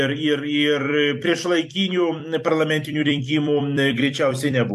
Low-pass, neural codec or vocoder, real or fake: 14.4 kHz; vocoder, 44.1 kHz, 128 mel bands every 256 samples, BigVGAN v2; fake